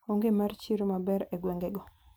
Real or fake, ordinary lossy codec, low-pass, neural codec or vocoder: real; none; none; none